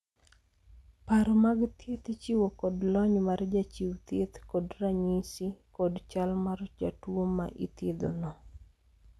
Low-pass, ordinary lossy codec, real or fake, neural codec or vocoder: none; none; real; none